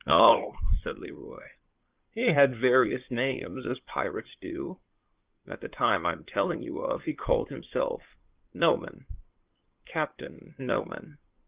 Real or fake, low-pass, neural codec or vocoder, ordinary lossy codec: fake; 3.6 kHz; codec, 16 kHz in and 24 kHz out, 2.2 kbps, FireRedTTS-2 codec; Opus, 32 kbps